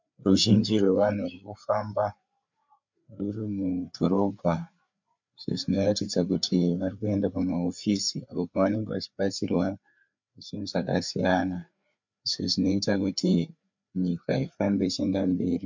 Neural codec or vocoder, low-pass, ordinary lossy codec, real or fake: codec, 16 kHz, 4 kbps, FreqCodec, larger model; 7.2 kHz; MP3, 64 kbps; fake